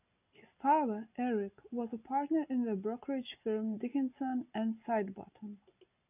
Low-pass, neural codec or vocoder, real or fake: 3.6 kHz; none; real